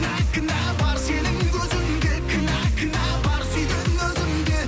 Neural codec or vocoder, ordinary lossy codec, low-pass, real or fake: none; none; none; real